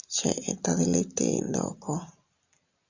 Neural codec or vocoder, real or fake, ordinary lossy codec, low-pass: none; real; Opus, 64 kbps; 7.2 kHz